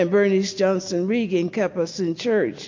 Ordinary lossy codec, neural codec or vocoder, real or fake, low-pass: MP3, 48 kbps; none; real; 7.2 kHz